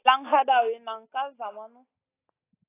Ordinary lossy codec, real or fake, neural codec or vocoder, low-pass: AAC, 16 kbps; real; none; 3.6 kHz